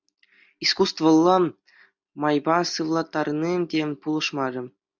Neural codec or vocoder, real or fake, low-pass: none; real; 7.2 kHz